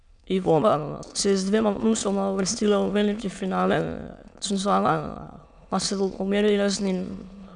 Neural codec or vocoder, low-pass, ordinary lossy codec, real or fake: autoencoder, 22.05 kHz, a latent of 192 numbers a frame, VITS, trained on many speakers; 9.9 kHz; none; fake